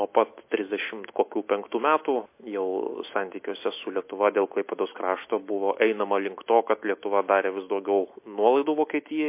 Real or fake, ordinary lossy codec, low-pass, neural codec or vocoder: real; MP3, 24 kbps; 3.6 kHz; none